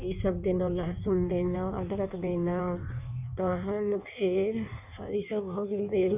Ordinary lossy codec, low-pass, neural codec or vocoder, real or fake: none; 3.6 kHz; codec, 16 kHz in and 24 kHz out, 1.1 kbps, FireRedTTS-2 codec; fake